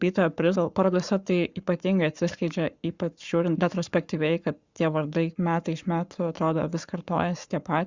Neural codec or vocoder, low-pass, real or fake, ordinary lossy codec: codec, 44.1 kHz, 7.8 kbps, Pupu-Codec; 7.2 kHz; fake; Opus, 64 kbps